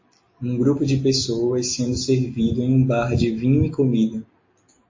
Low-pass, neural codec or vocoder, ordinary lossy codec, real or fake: 7.2 kHz; none; MP3, 32 kbps; real